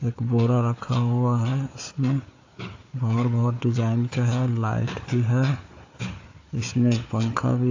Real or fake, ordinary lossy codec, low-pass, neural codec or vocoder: fake; AAC, 48 kbps; 7.2 kHz; codec, 16 kHz, 4 kbps, FunCodec, trained on Chinese and English, 50 frames a second